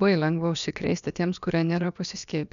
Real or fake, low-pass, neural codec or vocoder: fake; 7.2 kHz; codec, 16 kHz, about 1 kbps, DyCAST, with the encoder's durations